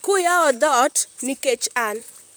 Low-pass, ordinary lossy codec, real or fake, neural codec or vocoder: none; none; fake; vocoder, 44.1 kHz, 128 mel bands, Pupu-Vocoder